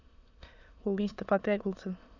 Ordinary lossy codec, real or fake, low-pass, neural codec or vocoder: none; fake; 7.2 kHz; autoencoder, 22.05 kHz, a latent of 192 numbers a frame, VITS, trained on many speakers